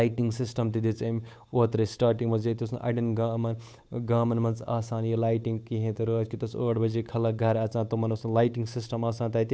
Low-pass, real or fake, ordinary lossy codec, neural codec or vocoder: none; fake; none; codec, 16 kHz, 8 kbps, FunCodec, trained on Chinese and English, 25 frames a second